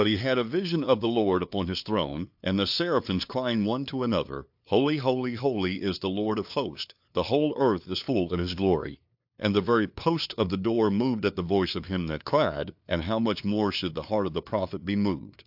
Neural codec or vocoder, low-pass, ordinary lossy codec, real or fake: codec, 16 kHz, 2 kbps, FunCodec, trained on LibriTTS, 25 frames a second; 5.4 kHz; AAC, 48 kbps; fake